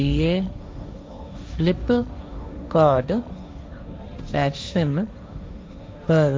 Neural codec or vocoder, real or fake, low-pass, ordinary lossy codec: codec, 16 kHz, 1.1 kbps, Voila-Tokenizer; fake; none; none